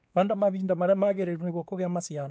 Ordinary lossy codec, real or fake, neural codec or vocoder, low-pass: none; fake; codec, 16 kHz, 2 kbps, X-Codec, WavLM features, trained on Multilingual LibriSpeech; none